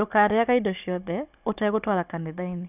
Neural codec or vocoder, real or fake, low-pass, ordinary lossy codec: codec, 16 kHz, 16 kbps, FunCodec, trained on Chinese and English, 50 frames a second; fake; 3.6 kHz; none